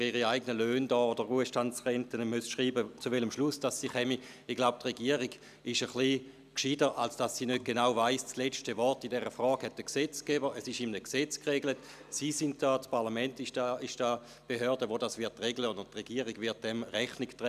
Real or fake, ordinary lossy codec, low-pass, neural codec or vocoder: real; none; 14.4 kHz; none